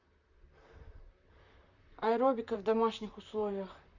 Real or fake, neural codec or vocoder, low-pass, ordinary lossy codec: fake; vocoder, 44.1 kHz, 128 mel bands, Pupu-Vocoder; 7.2 kHz; Opus, 64 kbps